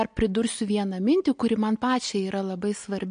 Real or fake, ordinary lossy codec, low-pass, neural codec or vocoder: real; MP3, 48 kbps; 9.9 kHz; none